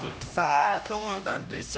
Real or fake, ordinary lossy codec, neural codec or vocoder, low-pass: fake; none; codec, 16 kHz, 1 kbps, X-Codec, HuBERT features, trained on LibriSpeech; none